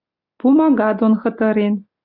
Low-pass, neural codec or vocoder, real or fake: 5.4 kHz; none; real